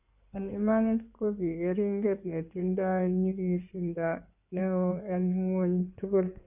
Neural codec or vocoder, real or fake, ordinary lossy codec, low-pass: codec, 16 kHz in and 24 kHz out, 2.2 kbps, FireRedTTS-2 codec; fake; none; 3.6 kHz